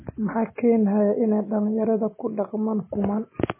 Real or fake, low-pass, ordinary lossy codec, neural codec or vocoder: real; 3.6 kHz; MP3, 16 kbps; none